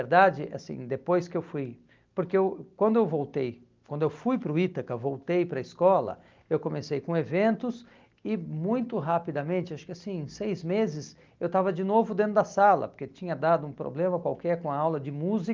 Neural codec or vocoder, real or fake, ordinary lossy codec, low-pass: none; real; Opus, 32 kbps; 7.2 kHz